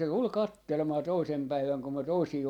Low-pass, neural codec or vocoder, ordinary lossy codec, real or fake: 19.8 kHz; vocoder, 44.1 kHz, 128 mel bands every 256 samples, BigVGAN v2; none; fake